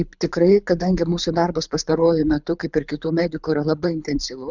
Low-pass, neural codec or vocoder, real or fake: 7.2 kHz; codec, 24 kHz, 6 kbps, HILCodec; fake